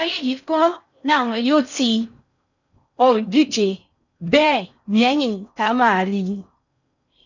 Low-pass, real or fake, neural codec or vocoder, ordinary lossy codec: 7.2 kHz; fake; codec, 16 kHz in and 24 kHz out, 0.6 kbps, FocalCodec, streaming, 4096 codes; none